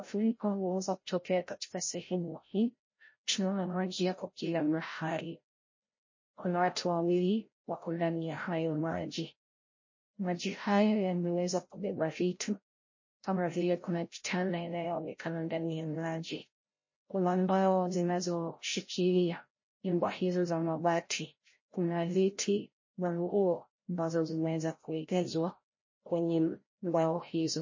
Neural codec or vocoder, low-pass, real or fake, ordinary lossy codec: codec, 16 kHz, 0.5 kbps, FreqCodec, larger model; 7.2 kHz; fake; MP3, 32 kbps